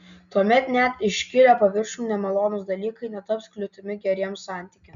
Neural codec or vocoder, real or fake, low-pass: none; real; 7.2 kHz